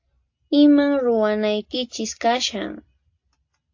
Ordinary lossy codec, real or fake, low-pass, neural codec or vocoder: AAC, 48 kbps; real; 7.2 kHz; none